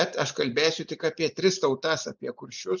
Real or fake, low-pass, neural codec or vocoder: real; 7.2 kHz; none